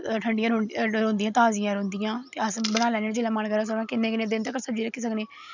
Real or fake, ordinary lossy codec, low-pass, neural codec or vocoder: real; none; 7.2 kHz; none